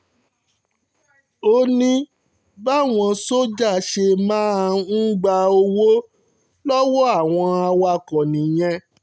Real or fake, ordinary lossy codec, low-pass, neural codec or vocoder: real; none; none; none